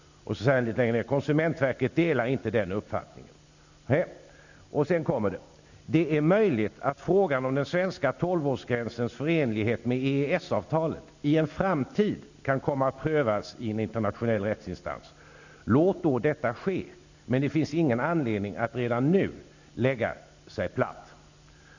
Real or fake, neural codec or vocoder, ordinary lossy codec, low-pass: real; none; none; 7.2 kHz